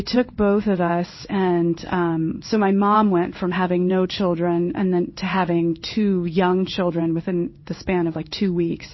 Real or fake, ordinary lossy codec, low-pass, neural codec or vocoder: fake; MP3, 24 kbps; 7.2 kHz; codec, 16 kHz in and 24 kHz out, 1 kbps, XY-Tokenizer